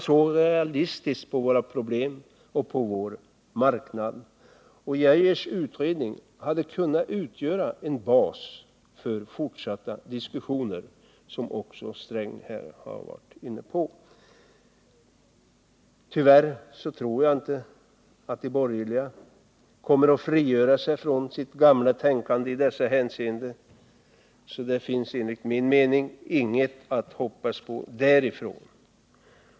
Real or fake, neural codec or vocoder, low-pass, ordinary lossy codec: real; none; none; none